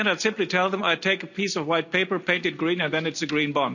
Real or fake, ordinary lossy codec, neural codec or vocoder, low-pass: real; none; none; 7.2 kHz